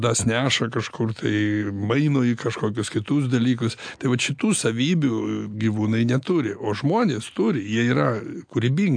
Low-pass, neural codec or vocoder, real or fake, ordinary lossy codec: 9.9 kHz; none; real; AAC, 64 kbps